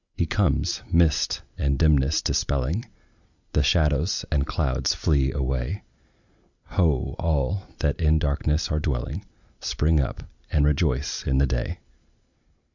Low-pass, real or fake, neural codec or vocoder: 7.2 kHz; real; none